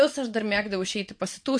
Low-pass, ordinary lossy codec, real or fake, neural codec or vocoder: 9.9 kHz; MP3, 48 kbps; fake; vocoder, 22.05 kHz, 80 mel bands, WaveNeXt